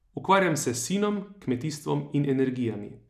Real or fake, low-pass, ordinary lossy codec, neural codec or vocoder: real; 14.4 kHz; none; none